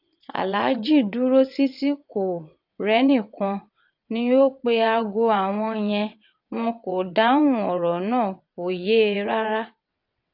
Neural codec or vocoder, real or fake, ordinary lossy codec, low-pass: vocoder, 22.05 kHz, 80 mel bands, WaveNeXt; fake; AAC, 48 kbps; 5.4 kHz